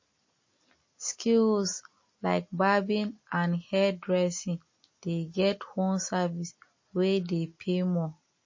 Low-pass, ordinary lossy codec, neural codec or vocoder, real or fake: 7.2 kHz; MP3, 32 kbps; none; real